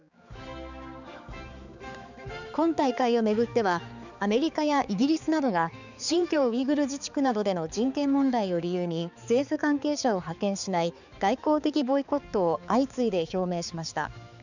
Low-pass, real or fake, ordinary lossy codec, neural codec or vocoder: 7.2 kHz; fake; none; codec, 16 kHz, 4 kbps, X-Codec, HuBERT features, trained on balanced general audio